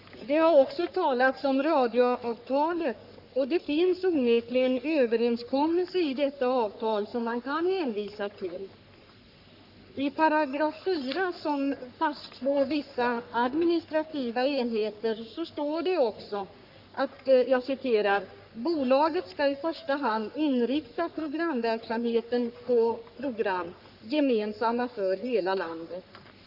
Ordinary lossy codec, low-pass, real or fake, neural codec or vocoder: Opus, 64 kbps; 5.4 kHz; fake; codec, 44.1 kHz, 3.4 kbps, Pupu-Codec